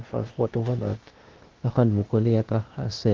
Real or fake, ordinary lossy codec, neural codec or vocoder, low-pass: fake; Opus, 16 kbps; codec, 16 kHz, 0.8 kbps, ZipCodec; 7.2 kHz